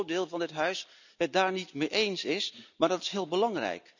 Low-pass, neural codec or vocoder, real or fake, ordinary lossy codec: 7.2 kHz; none; real; none